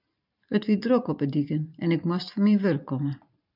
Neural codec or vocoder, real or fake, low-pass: vocoder, 44.1 kHz, 128 mel bands every 512 samples, BigVGAN v2; fake; 5.4 kHz